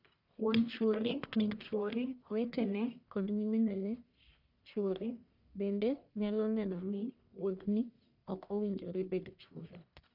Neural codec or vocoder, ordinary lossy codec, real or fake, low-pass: codec, 44.1 kHz, 1.7 kbps, Pupu-Codec; none; fake; 5.4 kHz